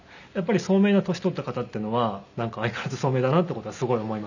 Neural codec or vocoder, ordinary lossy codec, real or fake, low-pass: none; none; real; 7.2 kHz